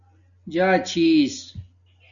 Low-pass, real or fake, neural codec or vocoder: 7.2 kHz; real; none